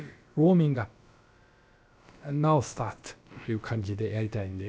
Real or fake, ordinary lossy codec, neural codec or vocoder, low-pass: fake; none; codec, 16 kHz, about 1 kbps, DyCAST, with the encoder's durations; none